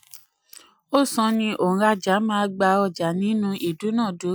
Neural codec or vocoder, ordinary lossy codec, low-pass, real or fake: none; none; none; real